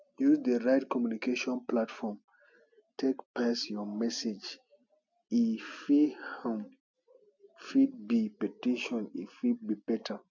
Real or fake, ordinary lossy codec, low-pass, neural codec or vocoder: real; none; 7.2 kHz; none